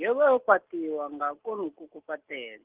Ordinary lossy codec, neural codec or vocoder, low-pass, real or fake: Opus, 16 kbps; none; 3.6 kHz; real